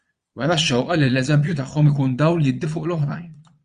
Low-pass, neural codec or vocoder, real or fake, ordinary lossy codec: 9.9 kHz; vocoder, 22.05 kHz, 80 mel bands, Vocos; fake; Opus, 64 kbps